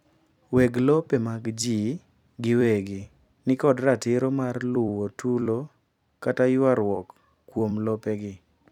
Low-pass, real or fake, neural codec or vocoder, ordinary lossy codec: 19.8 kHz; fake; vocoder, 44.1 kHz, 128 mel bands every 256 samples, BigVGAN v2; none